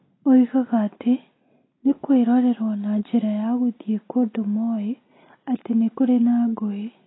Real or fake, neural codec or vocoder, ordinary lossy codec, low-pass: real; none; AAC, 16 kbps; 7.2 kHz